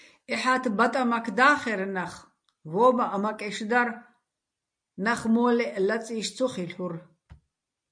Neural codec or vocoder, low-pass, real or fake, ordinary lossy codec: none; 9.9 kHz; real; MP3, 48 kbps